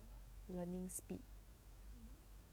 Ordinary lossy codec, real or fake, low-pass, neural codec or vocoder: none; fake; none; codec, 44.1 kHz, 7.8 kbps, DAC